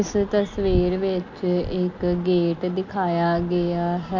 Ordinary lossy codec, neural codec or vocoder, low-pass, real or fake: none; none; 7.2 kHz; real